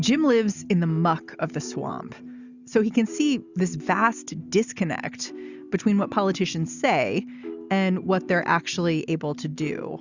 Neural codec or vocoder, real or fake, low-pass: none; real; 7.2 kHz